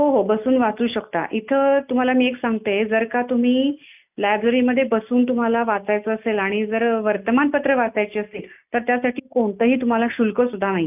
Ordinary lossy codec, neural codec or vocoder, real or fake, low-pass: none; none; real; 3.6 kHz